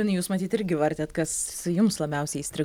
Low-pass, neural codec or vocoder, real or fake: 19.8 kHz; none; real